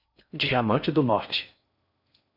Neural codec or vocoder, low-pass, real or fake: codec, 16 kHz in and 24 kHz out, 0.6 kbps, FocalCodec, streaming, 4096 codes; 5.4 kHz; fake